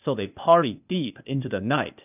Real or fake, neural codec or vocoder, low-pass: fake; codec, 16 kHz, 0.8 kbps, ZipCodec; 3.6 kHz